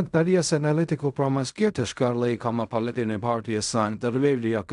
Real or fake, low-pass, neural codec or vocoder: fake; 10.8 kHz; codec, 16 kHz in and 24 kHz out, 0.4 kbps, LongCat-Audio-Codec, fine tuned four codebook decoder